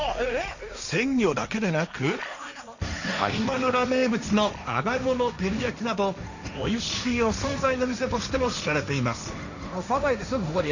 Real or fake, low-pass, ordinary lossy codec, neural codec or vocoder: fake; 7.2 kHz; none; codec, 16 kHz, 1.1 kbps, Voila-Tokenizer